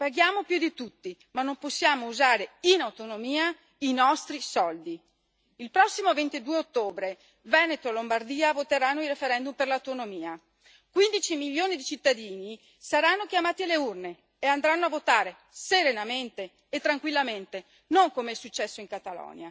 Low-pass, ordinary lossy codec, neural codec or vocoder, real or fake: none; none; none; real